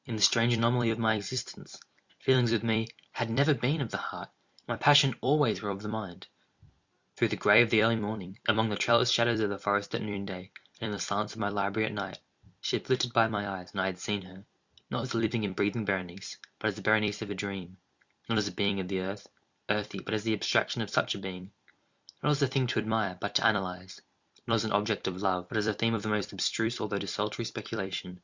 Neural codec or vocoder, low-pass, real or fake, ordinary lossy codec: vocoder, 44.1 kHz, 128 mel bands every 256 samples, BigVGAN v2; 7.2 kHz; fake; Opus, 64 kbps